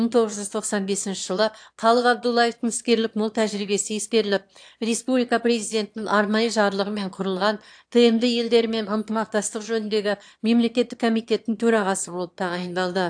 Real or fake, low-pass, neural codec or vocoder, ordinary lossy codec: fake; 9.9 kHz; autoencoder, 22.05 kHz, a latent of 192 numbers a frame, VITS, trained on one speaker; AAC, 64 kbps